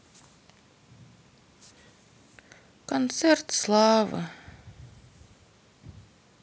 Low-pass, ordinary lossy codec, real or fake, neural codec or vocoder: none; none; real; none